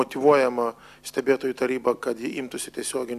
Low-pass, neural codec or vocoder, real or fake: 14.4 kHz; none; real